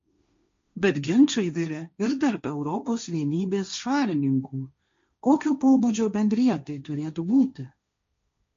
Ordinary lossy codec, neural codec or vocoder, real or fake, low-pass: MP3, 48 kbps; codec, 16 kHz, 1.1 kbps, Voila-Tokenizer; fake; 7.2 kHz